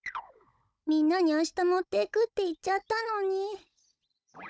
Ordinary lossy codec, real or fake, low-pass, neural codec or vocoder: none; fake; none; codec, 16 kHz, 16 kbps, FunCodec, trained on Chinese and English, 50 frames a second